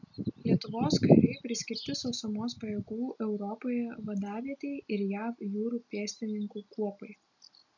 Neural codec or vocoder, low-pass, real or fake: none; 7.2 kHz; real